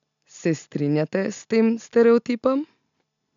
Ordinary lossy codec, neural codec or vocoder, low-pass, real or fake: MP3, 64 kbps; none; 7.2 kHz; real